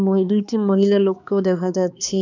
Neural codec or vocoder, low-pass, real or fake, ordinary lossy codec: codec, 16 kHz, 2 kbps, X-Codec, HuBERT features, trained on balanced general audio; 7.2 kHz; fake; none